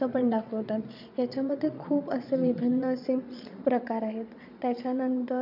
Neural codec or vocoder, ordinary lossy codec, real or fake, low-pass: vocoder, 22.05 kHz, 80 mel bands, Vocos; none; fake; 5.4 kHz